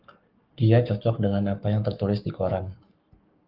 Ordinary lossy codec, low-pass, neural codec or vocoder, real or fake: Opus, 24 kbps; 5.4 kHz; codec, 44.1 kHz, 7.8 kbps, Pupu-Codec; fake